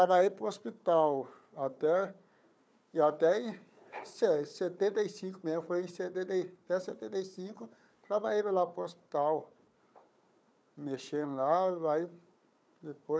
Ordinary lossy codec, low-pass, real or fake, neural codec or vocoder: none; none; fake; codec, 16 kHz, 4 kbps, FunCodec, trained on Chinese and English, 50 frames a second